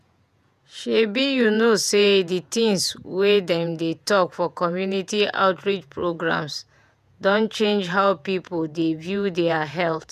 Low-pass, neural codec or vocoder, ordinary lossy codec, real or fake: 14.4 kHz; vocoder, 48 kHz, 128 mel bands, Vocos; none; fake